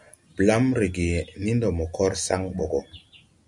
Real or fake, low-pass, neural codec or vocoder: real; 10.8 kHz; none